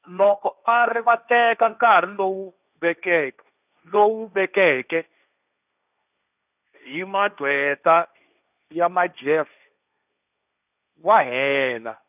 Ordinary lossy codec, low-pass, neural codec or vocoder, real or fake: none; 3.6 kHz; codec, 16 kHz, 1.1 kbps, Voila-Tokenizer; fake